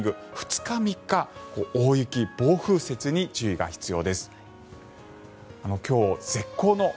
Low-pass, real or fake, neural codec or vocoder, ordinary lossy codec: none; real; none; none